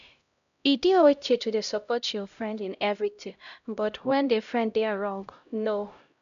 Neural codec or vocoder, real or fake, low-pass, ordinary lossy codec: codec, 16 kHz, 0.5 kbps, X-Codec, HuBERT features, trained on LibriSpeech; fake; 7.2 kHz; none